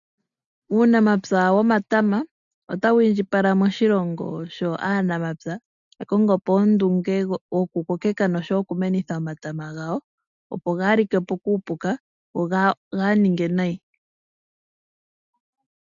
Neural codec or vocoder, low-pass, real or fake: none; 7.2 kHz; real